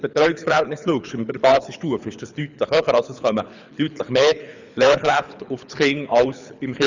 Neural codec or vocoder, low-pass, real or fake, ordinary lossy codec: codec, 24 kHz, 6 kbps, HILCodec; 7.2 kHz; fake; none